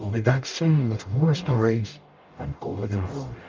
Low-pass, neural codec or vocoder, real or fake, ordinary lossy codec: 7.2 kHz; codec, 44.1 kHz, 0.9 kbps, DAC; fake; Opus, 24 kbps